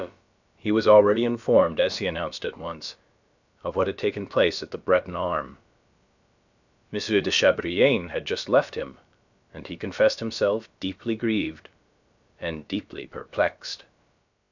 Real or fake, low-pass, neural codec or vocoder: fake; 7.2 kHz; codec, 16 kHz, about 1 kbps, DyCAST, with the encoder's durations